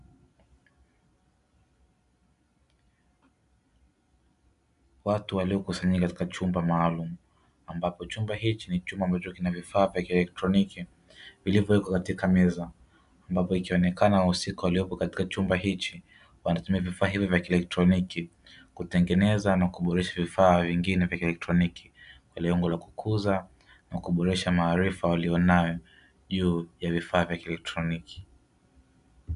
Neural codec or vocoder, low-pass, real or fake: none; 10.8 kHz; real